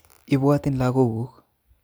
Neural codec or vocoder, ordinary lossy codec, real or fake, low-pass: none; none; real; none